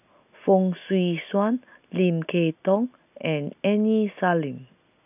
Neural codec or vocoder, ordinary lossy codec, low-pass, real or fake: none; none; 3.6 kHz; real